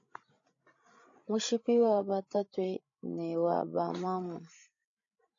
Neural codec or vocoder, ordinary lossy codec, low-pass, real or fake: codec, 16 kHz, 16 kbps, FreqCodec, larger model; AAC, 48 kbps; 7.2 kHz; fake